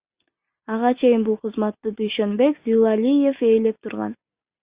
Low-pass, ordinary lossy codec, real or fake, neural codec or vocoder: 3.6 kHz; Opus, 64 kbps; real; none